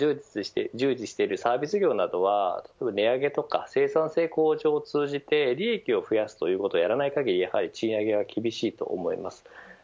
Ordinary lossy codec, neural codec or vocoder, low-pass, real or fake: none; none; none; real